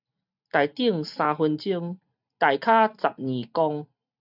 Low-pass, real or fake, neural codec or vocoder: 5.4 kHz; real; none